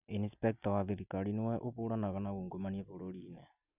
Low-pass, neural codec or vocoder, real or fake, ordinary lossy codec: 3.6 kHz; none; real; none